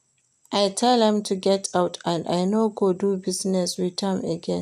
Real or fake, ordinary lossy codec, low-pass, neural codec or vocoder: real; none; 14.4 kHz; none